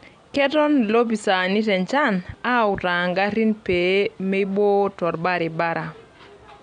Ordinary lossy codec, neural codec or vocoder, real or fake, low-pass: none; none; real; 9.9 kHz